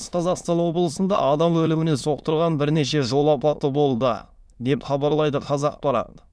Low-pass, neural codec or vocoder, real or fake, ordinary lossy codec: none; autoencoder, 22.05 kHz, a latent of 192 numbers a frame, VITS, trained on many speakers; fake; none